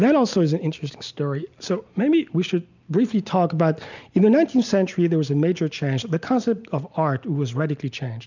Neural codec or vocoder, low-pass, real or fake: none; 7.2 kHz; real